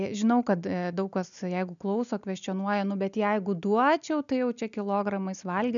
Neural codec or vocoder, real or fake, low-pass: none; real; 7.2 kHz